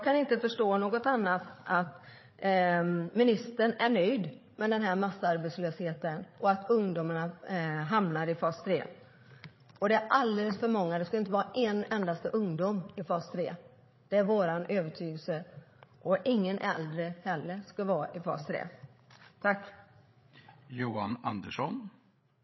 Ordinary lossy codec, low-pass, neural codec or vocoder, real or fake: MP3, 24 kbps; 7.2 kHz; codec, 16 kHz, 8 kbps, FreqCodec, larger model; fake